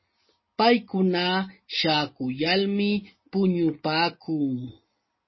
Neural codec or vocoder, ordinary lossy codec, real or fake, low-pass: none; MP3, 24 kbps; real; 7.2 kHz